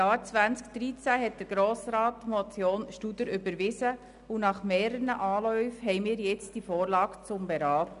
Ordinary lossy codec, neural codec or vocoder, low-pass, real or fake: none; none; 10.8 kHz; real